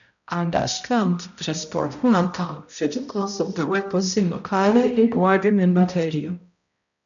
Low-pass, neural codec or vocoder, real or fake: 7.2 kHz; codec, 16 kHz, 0.5 kbps, X-Codec, HuBERT features, trained on balanced general audio; fake